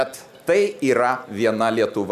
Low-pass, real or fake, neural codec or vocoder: 14.4 kHz; real; none